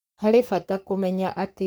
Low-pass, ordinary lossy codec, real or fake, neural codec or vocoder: none; none; fake; codec, 44.1 kHz, 3.4 kbps, Pupu-Codec